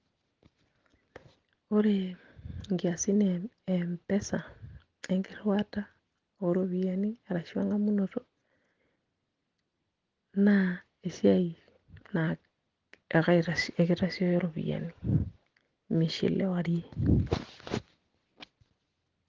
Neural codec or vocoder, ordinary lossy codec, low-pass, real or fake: none; Opus, 16 kbps; 7.2 kHz; real